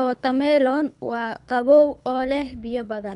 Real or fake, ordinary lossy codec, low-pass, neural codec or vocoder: fake; none; 10.8 kHz; codec, 24 kHz, 3 kbps, HILCodec